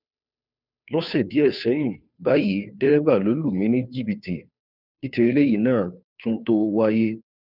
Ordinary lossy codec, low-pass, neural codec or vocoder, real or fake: none; 5.4 kHz; codec, 16 kHz, 2 kbps, FunCodec, trained on Chinese and English, 25 frames a second; fake